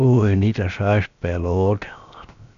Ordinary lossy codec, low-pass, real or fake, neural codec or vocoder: none; 7.2 kHz; fake; codec, 16 kHz, 0.7 kbps, FocalCodec